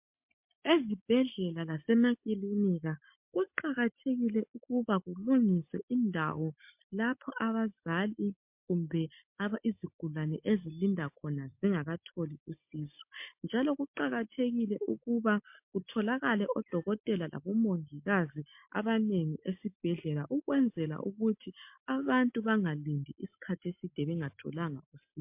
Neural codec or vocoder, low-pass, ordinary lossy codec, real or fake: none; 3.6 kHz; MP3, 32 kbps; real